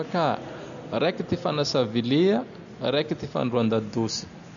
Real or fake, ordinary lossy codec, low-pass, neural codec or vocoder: real; none; 7.2 kHz; none